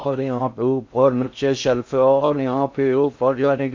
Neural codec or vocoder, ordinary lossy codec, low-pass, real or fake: codec, 16 kHz in and 24 kHz out, 0.6 kbps, FocalCodec, streaming, 2048 codes; MP3, 48 kbps; 7.2 kHz; fake